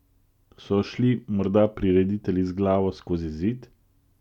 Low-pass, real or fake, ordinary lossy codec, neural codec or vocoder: 19.8 kHz; real; none; none